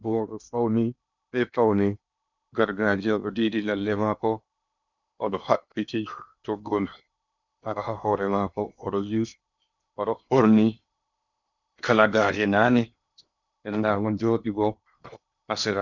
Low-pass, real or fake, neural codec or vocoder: 7.2 kHz; fake; codec, 16 kHz in and 24 kHz out, 0.8 kbps, FocalCodec, streaming, 65536 codes